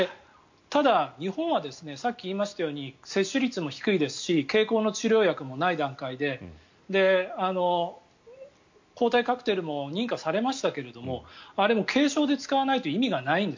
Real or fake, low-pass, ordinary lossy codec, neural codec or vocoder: real; 7.2 kHz; MP3, 48 kbps; none